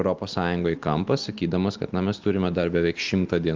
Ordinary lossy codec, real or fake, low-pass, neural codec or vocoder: Opus, 32 kbps; real; 7.2 kHz; none